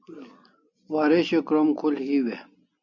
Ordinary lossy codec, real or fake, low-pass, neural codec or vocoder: MP3, 64 kbps; real; 7.2 kHz; none